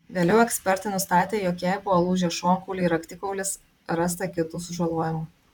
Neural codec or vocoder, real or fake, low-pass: vocoder, 44.1 kHz, 128 mel bands, Pupu-Vocoder; fake; 19.8 kHz